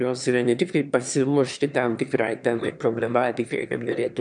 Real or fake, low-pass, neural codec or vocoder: fake; 9.9 kHz; autoencoder, 22.05 kHz, a latent of 192 numbers a frame, VITS, trained on one speaker